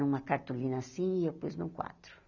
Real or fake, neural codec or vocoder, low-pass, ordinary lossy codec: real; none; 7.2 kHz; none